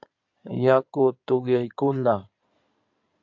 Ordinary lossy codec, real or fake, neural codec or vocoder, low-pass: AAC, 48 kbps; fake; codec, 16 kHz in and 24 kHz out, 2.2 kbps, FireRedTTS-2 codec; 7.2 kHz